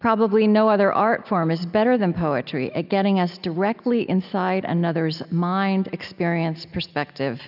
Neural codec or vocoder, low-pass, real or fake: none; 5.4 kHz; real